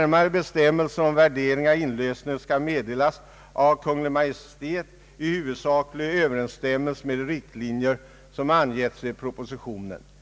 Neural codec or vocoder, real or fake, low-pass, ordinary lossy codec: none; real; none; none